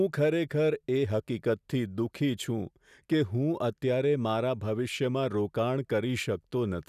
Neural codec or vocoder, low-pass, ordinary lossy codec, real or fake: none; 14.4 kHz; none; real